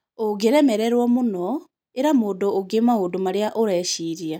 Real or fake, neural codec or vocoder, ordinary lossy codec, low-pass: real; none; none; 19.8 kHz